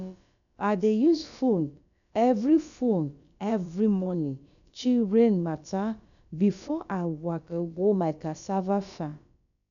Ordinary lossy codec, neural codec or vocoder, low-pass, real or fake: none; codec, 16 kHz, about 1 kbps, DyCAST, with the encoder's durations; 7.2 kHz; fake